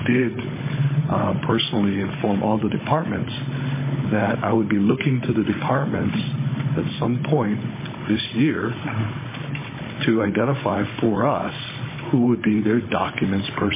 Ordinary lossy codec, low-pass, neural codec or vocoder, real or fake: MP3, 16 kbps; 3.6 kHz; codec, 16 kHz, 16 kbps, FunCodec, trained on Chinese and English, 50 frames a second; fake